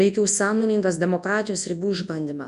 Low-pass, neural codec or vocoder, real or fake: 10.8 kHz; codec, 24 kHz, 0.9 kbps, WavTokenizer, large speech release; fake